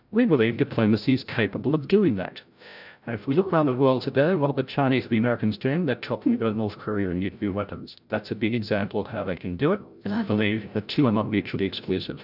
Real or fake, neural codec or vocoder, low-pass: fake; codec, 16 kHz, 0.5 kbps, FreqCodec, larger model; 5.4 kHz